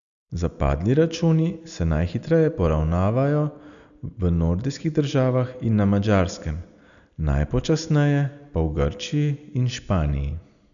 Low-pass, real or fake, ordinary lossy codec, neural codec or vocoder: 7.2 kHz; real; none; none